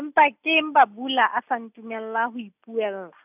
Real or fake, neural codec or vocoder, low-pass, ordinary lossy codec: real; none; 3.6 kHz; none